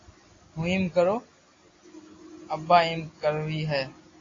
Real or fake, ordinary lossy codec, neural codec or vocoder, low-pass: real; AAC, 32 kbps; none; 7.2 kHz